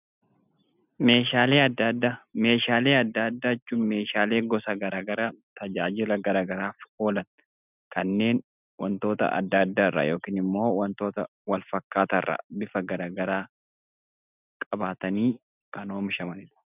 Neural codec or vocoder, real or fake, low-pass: none; real; 3.6 kHz